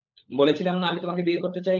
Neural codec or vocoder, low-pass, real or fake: codec, 16 kHz, 16 kbps, FunCodec, trained on LibriTTS, 50 frames a second; 7.2 kHz; fake